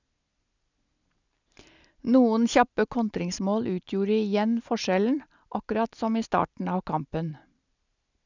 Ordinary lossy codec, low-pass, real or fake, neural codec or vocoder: none; 7.2 kHz; real; none